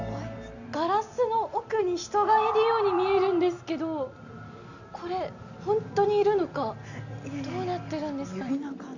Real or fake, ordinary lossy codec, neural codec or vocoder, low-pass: real; none; none; 7.2 kHz